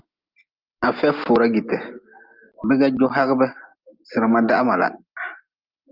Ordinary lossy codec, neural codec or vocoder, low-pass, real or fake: Opus, 32 kbps; none; 5.4 kHz; real